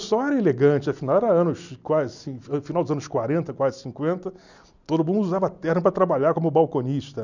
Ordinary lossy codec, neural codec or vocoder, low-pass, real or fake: none; none; 7.2 kHz; real